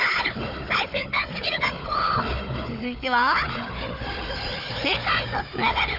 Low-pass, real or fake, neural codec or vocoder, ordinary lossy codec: 5.4 kHz; fake; codec, 16 kHz, 4 kbps, FunCodec, trained on Chinese and English, 50 frames a second; none